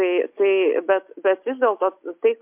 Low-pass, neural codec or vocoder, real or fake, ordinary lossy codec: 3.6 kHz; none; real; MP3, 32 kbps